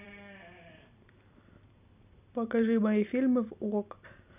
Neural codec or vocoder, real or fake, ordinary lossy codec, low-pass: vocoder, 44.1 kHz, 128 mel bands every 256 samples, BigVGAN v2; fake; none; 3.6 kHz